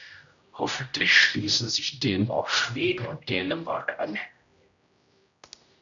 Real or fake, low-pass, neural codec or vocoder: fake; 7.2 kHz; codec, 16 kHz, 0.5 kbps, X-Codec, HuBERT features, trained on general audio